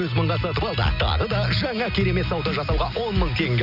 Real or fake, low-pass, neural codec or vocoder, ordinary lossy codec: real; 5.4 kHz; none; none